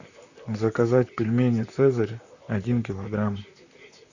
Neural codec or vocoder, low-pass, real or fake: vocoder, 44.1 kHz, 128 mel bands, Pupu-Vocoder; 7.2 kHz; fake